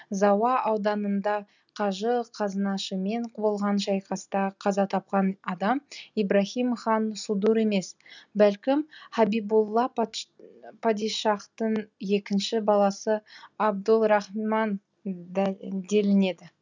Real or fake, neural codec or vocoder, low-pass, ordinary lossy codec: real; none; 7.2 kHz; none